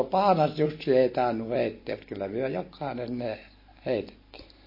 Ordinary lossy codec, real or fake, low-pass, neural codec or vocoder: MP3, 24 kbps; real; 5.4 kHz; none